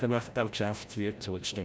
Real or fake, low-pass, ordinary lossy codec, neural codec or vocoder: fake; none; none; codec, 16 kHz, 0.5 kbps, FreqCodec, larger model